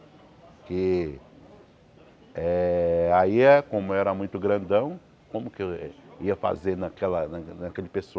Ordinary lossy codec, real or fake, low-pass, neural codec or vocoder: none; real; none; none